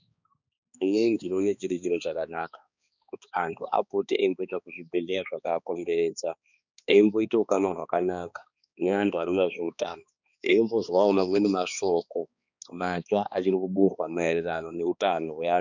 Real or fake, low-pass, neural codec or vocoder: fake; 7.2 kHz; codec, 16 kHz, 2 kbps, X-Codec, HuBERT features, trained on balanced general audio